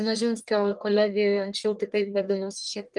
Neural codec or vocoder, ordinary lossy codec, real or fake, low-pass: codec, 44.1 kHz, 1.7 kbps, Pupu-Codec; Opus, 24 kbps; fake; 10.8 kHz